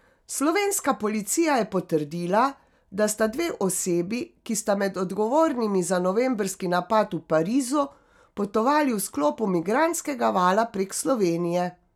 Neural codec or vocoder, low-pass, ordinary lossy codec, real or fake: none; 19.8 kHz; none; real